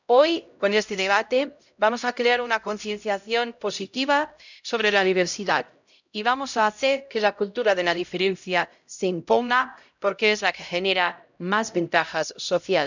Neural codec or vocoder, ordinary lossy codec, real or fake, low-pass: codec, 16 kHz, 0.5 kbps, X-Codec, HuBERT features, trained on LibriSpeech; none; fake; 7.2 kHz